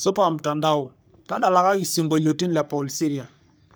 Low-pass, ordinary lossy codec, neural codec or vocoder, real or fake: none; none; codec, 44.1 kHz, 3.4 kbps, Pupu-Codec; fake